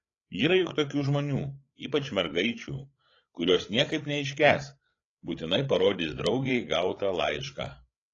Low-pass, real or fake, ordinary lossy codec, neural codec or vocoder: 7.2 kHz; fake; AAC, 32 kbps; codec, 16 kHz, 8 kbps, FreqCodec, larger model